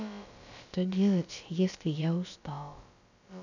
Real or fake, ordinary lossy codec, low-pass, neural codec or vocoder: fake; none; 7.2 kHz; codec, 16 kHz, about 1 kbps, DyCAST, with the encoder's durations